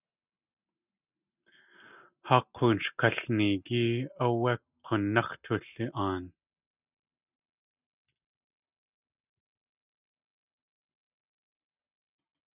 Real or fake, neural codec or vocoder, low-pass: real; none; 3.6 kHz